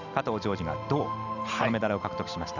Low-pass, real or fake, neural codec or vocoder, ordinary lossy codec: 7.2 kHz; real; none; none